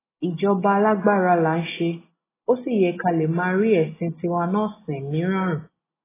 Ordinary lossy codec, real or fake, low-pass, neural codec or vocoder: AAC, 16 kbps; real; 3.6 kHz; none